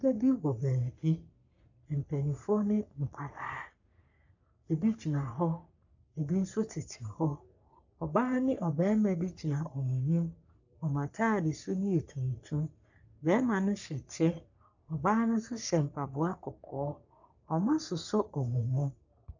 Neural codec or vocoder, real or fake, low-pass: codec, 44.1 kHz, 3.4 kbps, Pupu-Codec; fake; 7.2 kHz